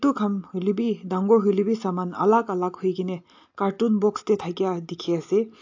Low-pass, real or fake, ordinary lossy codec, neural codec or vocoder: 7.2 kHz; real; AAC, 48 kbps; none